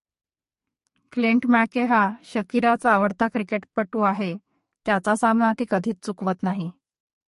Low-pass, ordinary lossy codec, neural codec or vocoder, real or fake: 14.4 kHz; MP3, 48 kbps; codec, 44.1 kHz, 2.6 kbps, SNAC; fake